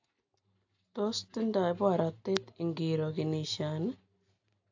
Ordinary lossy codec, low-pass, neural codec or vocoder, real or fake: AAC, 48 kbps; 7.2 kHz; none; real